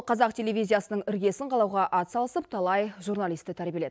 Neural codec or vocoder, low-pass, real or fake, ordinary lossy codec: none; none; real; none